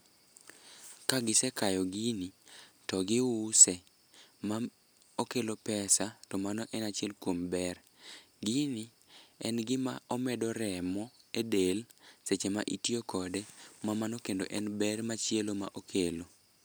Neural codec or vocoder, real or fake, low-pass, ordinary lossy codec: none; real; none; none